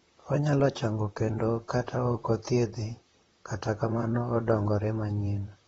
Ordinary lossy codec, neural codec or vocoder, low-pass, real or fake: AAC, 24 kbps; vocoder, 44.1 kHz, 128 mel bands, Pupu-Vocoder; 19.8 kHz; fake